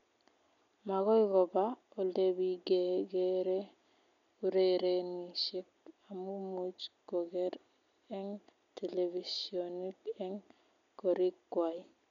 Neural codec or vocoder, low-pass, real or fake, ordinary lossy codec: none; 7.2 kHz; real; none